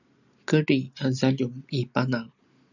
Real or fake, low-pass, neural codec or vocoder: real; 7.2 kHz; none